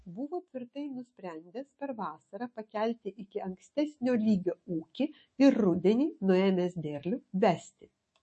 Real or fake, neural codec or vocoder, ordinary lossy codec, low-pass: fake; autoencoder, 48 kHz, 128 numbers a frame, DAC-VAE, trained on Japanese speech; MP3, 32 kbps; 10.8 kHz